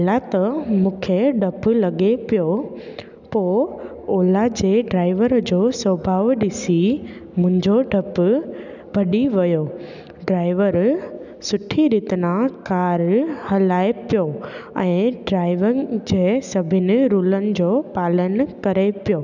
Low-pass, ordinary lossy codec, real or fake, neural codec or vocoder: 7.2 kHz; none; real; none